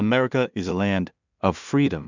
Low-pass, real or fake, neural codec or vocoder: 7.2 kHz; fake; codec, 16 kHz in and 24 kHz out, 0.4 kbps, LongCat-Audio-Codec, two codebook decoder